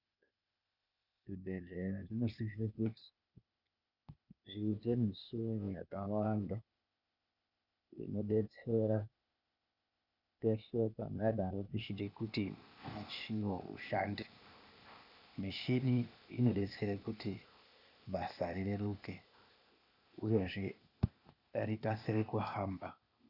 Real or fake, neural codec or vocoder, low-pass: fake; codec, 16 kHz, 0.8 kbps, ZipCodec; 5.4 kHz